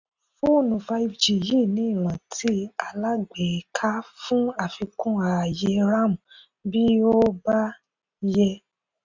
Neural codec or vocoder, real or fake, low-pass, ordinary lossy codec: none; real; 7.2 kHz; none